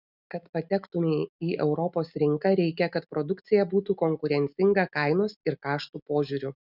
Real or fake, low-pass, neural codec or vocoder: real; 5.4 kHz; none